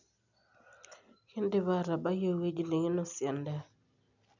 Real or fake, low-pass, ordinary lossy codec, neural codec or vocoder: real; 7.2 kHz; none; none